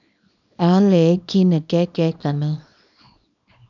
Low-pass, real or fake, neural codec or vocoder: 7.2 kHz; fake; codec, 24 kHz, 0.9 kbps, WavTokenizer, small release